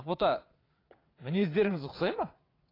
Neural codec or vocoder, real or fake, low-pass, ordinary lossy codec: vocoder, 22.05 kHz, 80 mel bands, WaveNeXt; fake; 5.4 kHz; AAC, 24 kbps